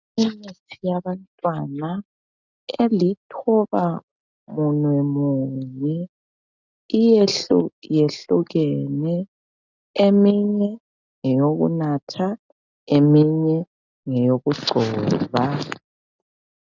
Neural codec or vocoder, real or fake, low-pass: none; real; 7.2 kHz